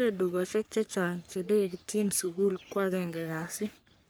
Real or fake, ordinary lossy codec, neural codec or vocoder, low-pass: fake; none; codec, 44.1 kHz, 3.4 kbps, Pupu-Codec; none